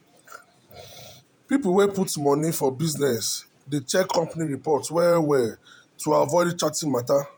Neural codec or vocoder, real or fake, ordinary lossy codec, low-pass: vocoder, 48 kHz, 128 mel bands, Vocos; fake; none; none